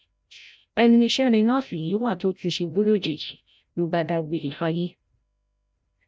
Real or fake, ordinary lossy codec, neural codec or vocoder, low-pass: fake; none; codec, 16 kHz, 0.5 kbps, FreqCodec, larger model; none